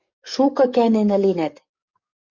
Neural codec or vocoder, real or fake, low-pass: codec, 44.1 kHz, 7.8 kbps, DAC; fake; 7.2 kHz